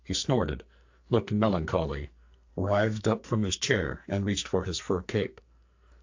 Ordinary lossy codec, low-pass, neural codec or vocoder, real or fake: AAC, 48 kbps; 7.2 kHz; codec, 44.1 kHz, 2.6 kbps, SNAC; fake